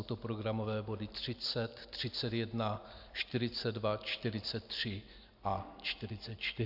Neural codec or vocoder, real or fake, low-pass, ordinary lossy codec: none; real; 5.4 kHz; AAC, 48 kbps